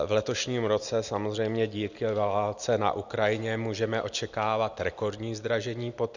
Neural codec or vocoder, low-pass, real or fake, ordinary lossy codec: none; 7.2 kHz; real; Opus, 64 kbps